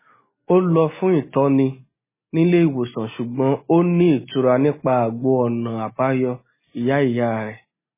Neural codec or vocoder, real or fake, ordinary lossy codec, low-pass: none; real; MP3, 16 kbps; 3.6 kHz